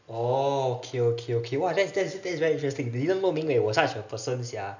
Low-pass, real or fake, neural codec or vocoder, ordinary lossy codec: 7.2 kHz; real; none; none